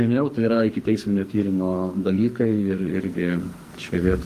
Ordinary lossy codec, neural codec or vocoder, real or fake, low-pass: Opus, 32 kbps; codec, 44.1 kHz, 2.6 kbps, SNAC; fake; 14.4 kHz